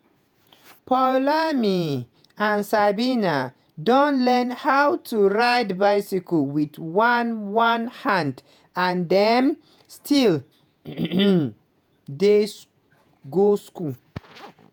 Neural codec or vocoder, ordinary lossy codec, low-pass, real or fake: vocoder, 48 kHz, 128 mel bands, Vocos; none; none; fake